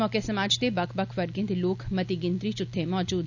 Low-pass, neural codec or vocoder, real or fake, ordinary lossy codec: 7.2 kHz; none; real; none